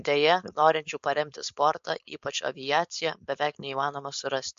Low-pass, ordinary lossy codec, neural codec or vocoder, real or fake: 7.2 kHz; MP3, 48 kbps; codec, 16 kHz, 4.8 kbps, FACodec; fake